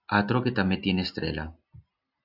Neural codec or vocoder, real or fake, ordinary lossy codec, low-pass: none; real; AAC, 48 kbps; 5.4 kHz